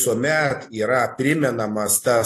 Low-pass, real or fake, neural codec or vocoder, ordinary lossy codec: 14.4 kHz; real; none; AAC, 48 kbps